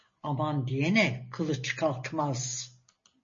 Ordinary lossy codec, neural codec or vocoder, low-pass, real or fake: MP3, 32 kbps; none; 7.2 kHz; real